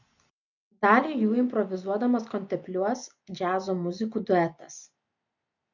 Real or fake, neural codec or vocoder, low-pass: real; none; 7.2 kHz